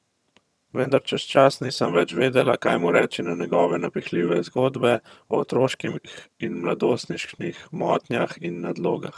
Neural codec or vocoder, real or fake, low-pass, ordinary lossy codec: vocoder, 22.05 kHz, 80 mel bands, HiFi-GAN; fake; none; none